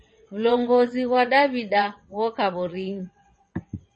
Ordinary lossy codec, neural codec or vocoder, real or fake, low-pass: MP3, 32 kbps; vocoder, 22.05 kHz, 80 mel bands, WaveNeXt; fake; 9.9 kHz